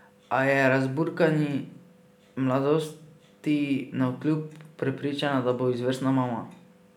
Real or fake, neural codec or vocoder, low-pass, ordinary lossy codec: real; none; 19.8 kHz; none